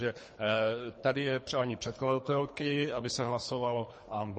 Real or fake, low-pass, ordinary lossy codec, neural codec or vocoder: fake; 10.8 kHz; MP3, 32 kbps; codec, 24 kHz, 3 kbps, HILCodec